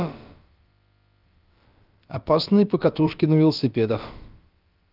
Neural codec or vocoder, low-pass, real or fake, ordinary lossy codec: codec, 16 kHz, about 1 kbps, DyCAST, with the encoder's durations; 5.4 kHz; fake; Opus, 24 kbps